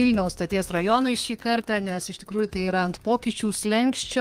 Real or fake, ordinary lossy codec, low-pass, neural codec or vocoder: fake; Opus, 32 kbps; 14.4 kHz; codec, 32 kHz, 1.9 kbps, SNAC